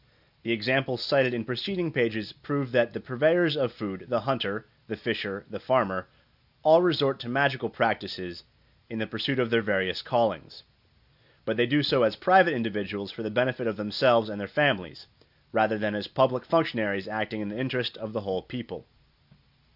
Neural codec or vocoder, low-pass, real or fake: none; 5.4 kHz; real